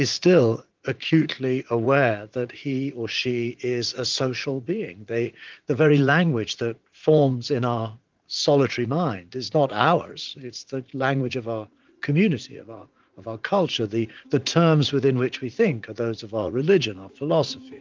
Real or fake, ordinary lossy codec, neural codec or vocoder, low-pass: fake; Opus, 32 kbps; vocoder, 44.1 kHz, 80 mel bands, Vocos; 7.2 kHz